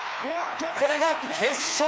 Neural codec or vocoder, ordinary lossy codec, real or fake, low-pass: codec, 16 kHz, 2 kbps, FreqCodec, larger model; none; fake; none